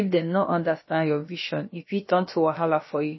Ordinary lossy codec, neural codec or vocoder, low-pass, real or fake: MP3, 24 kbps; codec, 16 kHz, about 1 kbps, DyCAST, with the encoder's durations; 7.2 kHz; fake